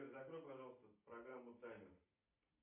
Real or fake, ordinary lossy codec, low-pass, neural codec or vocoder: real; MP3, 32 kbps; 3.6 kHz; none